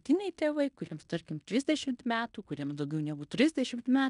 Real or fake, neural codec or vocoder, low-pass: fake; codec, 16 kHz in and 24 kHz out, 0.9 kbps, LongCat-Audio-Codec, fine tuned four codebook decoder; 10.8 kHz